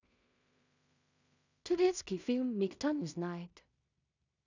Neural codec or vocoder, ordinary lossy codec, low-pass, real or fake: codec, 16 kHz in and 24 kHz out, 0.4 kbps, LongCat-Audio-Codec, two codebook decoder; none; 7.2 kHz; fake